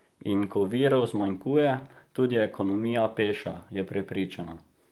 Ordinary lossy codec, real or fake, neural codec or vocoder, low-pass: Opus, 32 kbps; fake; vocoder, 44.1 kHz, 128 mel bands, Pupu-Vocoder; 19.8 kHz